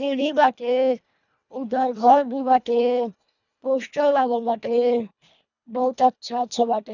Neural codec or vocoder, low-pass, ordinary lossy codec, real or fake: codec, 24 kHz, 1.5 kbps, HILCodec; 7.2 kHz; none; fake